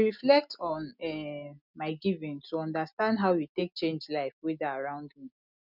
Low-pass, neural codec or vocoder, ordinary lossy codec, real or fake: 5.4 kHz; none; none; real